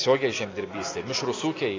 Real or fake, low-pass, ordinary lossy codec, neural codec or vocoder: real; 7.2 kHz; AAC, 32 kbps; none